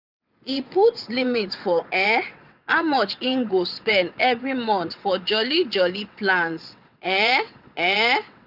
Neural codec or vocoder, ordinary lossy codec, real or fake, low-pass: vocoder, 22.05 kHz, 80 mel bands, WaveNeXt; none; fake; 5.4 kHz